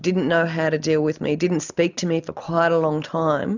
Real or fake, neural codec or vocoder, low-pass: real; none; 7.2 kHz